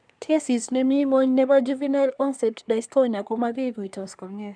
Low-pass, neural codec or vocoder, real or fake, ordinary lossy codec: 9.9 kHz; codec, 24 kHz, 1 kbps, SNAC; fake; none